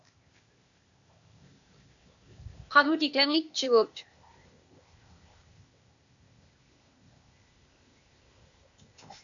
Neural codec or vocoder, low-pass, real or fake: codec, 16 kHz, 0.8 kbps, ZipCodec; 7.2 kHz; fake